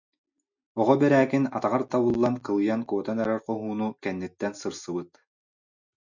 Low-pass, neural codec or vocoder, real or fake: 7.2 kHz; none; real